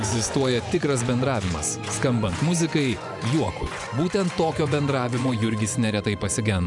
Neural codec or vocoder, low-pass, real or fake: vocoder, 24 kHz, 100 mel bands, Vocos; 10.8 kHz; fake